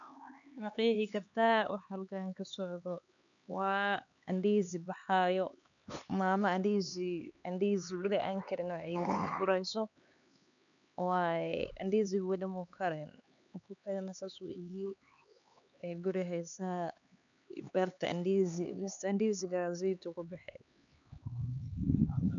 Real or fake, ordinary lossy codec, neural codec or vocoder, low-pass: fake; none; codec, 16 kHz, 4 kbps, X-Codec, HuBERT features, trained on LibriSpeech; 7.2 kHz